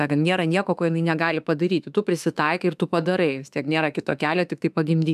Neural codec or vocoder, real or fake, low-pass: autoencoder, 48 kHz, 32 numbers a frame, DAC-VAE, trained on Japanese speech; fake; 14.4 kHz